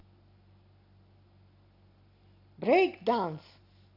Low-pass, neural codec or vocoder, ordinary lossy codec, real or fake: 5.4 kHz; none; AAC, 32 kbps; real